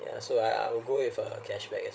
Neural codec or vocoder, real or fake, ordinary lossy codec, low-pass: codec, 16 kHz, 16 kbps, FreqCodec, larger model; fake; none; none